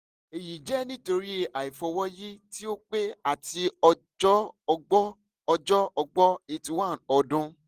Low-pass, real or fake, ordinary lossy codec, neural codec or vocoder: 14.4 kHz; real; Opus, 24 kbps; none